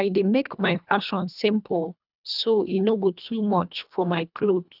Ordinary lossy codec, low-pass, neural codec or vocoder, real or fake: none; 5.4 kHz; codec, 24 kHz, 1.5 kbps, HILCodec; fake